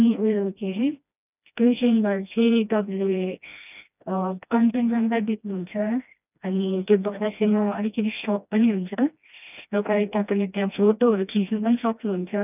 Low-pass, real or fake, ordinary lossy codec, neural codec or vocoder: 3.6 kHz; fake; none; codec, 16 kHz, 1 kbps, FreqCodec, smaller model